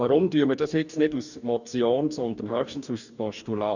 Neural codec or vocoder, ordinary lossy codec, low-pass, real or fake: codec, 44.1 kHz, 2.6 kbps, DAC; none; 7.2 kHz; fake